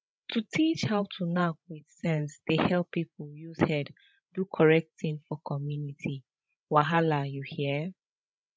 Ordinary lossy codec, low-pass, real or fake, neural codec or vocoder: none; none; fake; codec, 16 kHz, 16 kbps, FreqCodec, larger model